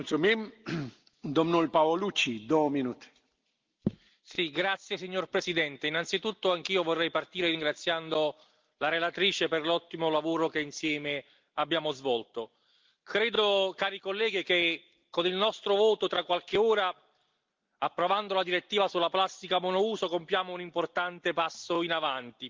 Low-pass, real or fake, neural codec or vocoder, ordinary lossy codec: 7.2 kHz; real; none; Opus, 32 kbps